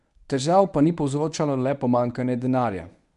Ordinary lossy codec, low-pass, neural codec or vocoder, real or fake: none; 10.8 kHz; codec, 24 kHz, 0.9 kbps, WavTokenizer, medium speech release version 1; fake